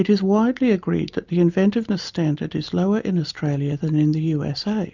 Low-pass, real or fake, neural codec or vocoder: 7.2 kHz; real; none